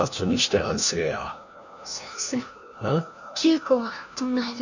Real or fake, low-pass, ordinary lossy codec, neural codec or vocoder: fake; 7.2 kHz; none; codec, 16 kHz, 1 kbps, FunCodec, trained on LibriTTS, 50 frames a second